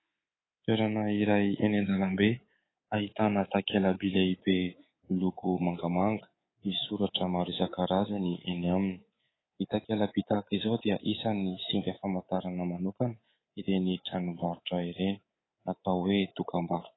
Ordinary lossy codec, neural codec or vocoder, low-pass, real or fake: AAC, 16 kbps; codec, 24 kHz, 3.1 kbps, DualCodec; 7.2 kHz; fake